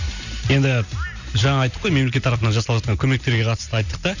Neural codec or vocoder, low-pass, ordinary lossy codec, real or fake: none; 7.2 kHz; none; real